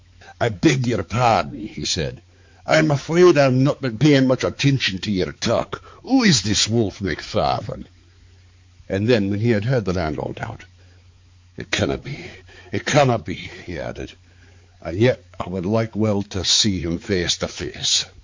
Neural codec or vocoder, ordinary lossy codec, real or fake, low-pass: codec, 16 kHz, 4 kbps, X-Codec, HuBERT features, trained on balanced general audio; MP3, 48 kbps; fake; 7.2 kHz